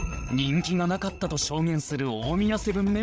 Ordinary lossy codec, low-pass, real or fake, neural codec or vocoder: none; none; fake; codec, 16 kHz, 8 kbps, FreqCodec, larger model